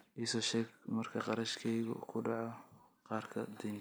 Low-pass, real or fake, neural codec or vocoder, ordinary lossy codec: none; real; none; none